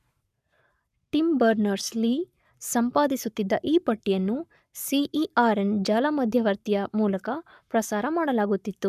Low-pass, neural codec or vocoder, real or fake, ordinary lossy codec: 14.4 kHz; codec, 44.1 kHz, 7.8 kbps, Pupu-Codec; fake; none